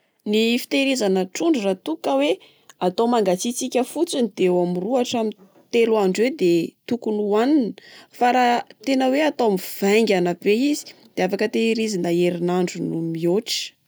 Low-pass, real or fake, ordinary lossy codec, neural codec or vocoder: none; real; none; none